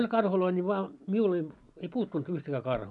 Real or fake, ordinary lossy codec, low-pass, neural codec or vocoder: real; none; 10.8 kHz; none